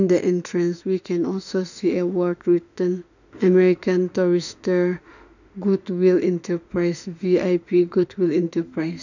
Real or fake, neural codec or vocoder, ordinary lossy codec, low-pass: fake; autoencoder, 48 kHz, 32 numbers a frame, DAC-VAE, trained on Japanese speech; none; 7.2 kHz